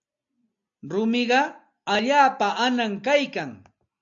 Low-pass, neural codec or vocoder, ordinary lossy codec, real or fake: 7.2 kHz; none; AAC, 64 kbps; real